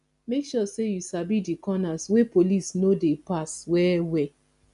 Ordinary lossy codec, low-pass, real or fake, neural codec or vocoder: none; 10.8 kHz; real; none